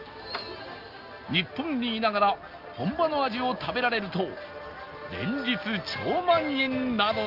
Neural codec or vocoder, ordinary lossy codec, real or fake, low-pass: none; Opus, 32 kbps; real; 5.4 kHz